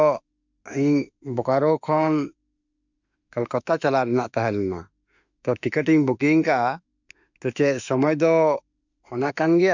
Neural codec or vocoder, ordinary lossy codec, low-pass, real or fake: autoencoder, 48 kHz, 32 numbers a frame, DAC-VAE, trained on Japanese speech; none; 7.2 kHz; fake